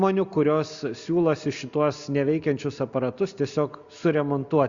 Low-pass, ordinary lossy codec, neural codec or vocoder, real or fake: 7.2 kHz; Opus, 64 kbps; none; real